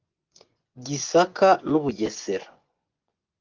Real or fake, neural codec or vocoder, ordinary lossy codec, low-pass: fake; vocoder, 24 kHz, 100 mel bands, Vocos; Opus, 32 kbps; 7.2 kHz